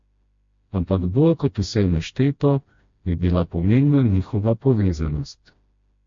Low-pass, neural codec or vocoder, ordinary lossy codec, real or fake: 7.2 kHz; codec, 16 kHz, 1 kbps, FreqCodec, smaller model; MP3, 48 kbps; fake